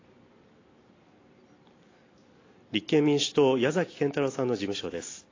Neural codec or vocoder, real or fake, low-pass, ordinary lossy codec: none; real; 7.2 kHz; AAC, 32 kbps